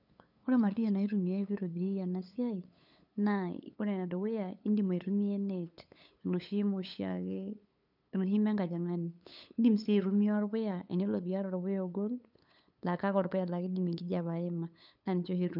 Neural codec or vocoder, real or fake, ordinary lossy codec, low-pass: codec, 16 kHz, 8 kbps, FunCodec, trained on LibriTTS, 25 frames a second; fake; none; 5.4 kHz